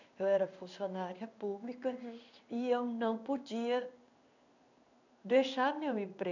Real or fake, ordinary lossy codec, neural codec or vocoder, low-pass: fake; none; codec, 16 kHz in and 24 kHz out, 1 kbps, XY-Tokenizer; 7.2 kHz